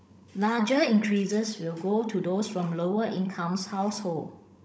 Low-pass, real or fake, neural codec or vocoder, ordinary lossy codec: none; fake; codec, 16 kHz, 16 kbps, FunCodec, trained on Chinese and English, 50 frames a second; none